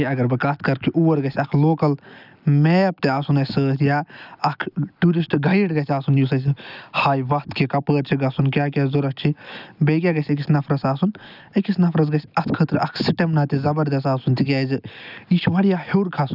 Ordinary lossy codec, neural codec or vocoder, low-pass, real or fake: none; none; 5.4 kHz; real